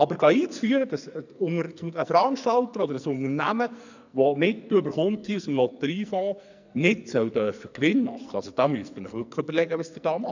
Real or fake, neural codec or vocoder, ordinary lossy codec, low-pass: fake; codec, 44.1 kHz, 2.6 kbps, SNAC; none; 7.2 kHz